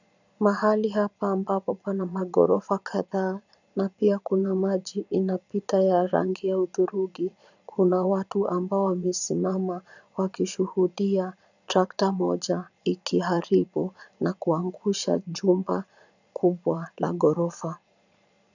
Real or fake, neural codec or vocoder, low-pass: fake; vocoder, 22.05 kHz, 80 mel bands, Vocos; 7.2 kHz